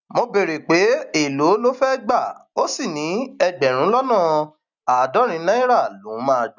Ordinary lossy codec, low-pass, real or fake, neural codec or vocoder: none; 7.2 kHz; real; none